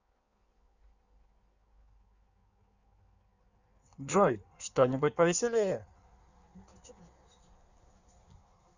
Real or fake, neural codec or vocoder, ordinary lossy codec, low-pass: fake; codec, 16 kHz in and 24 kHz out, 1.1 kbps, FireRedTTS-2 codec; none; 7.2 kHz